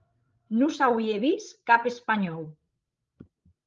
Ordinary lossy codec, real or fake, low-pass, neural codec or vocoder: Opus, 32 kbps; fake; 7.2 kHz; codec, 16 kHz, 16 kbps, FreqCodec, larger model